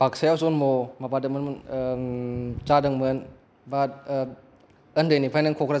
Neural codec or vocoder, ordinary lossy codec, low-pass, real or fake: none; none; none; real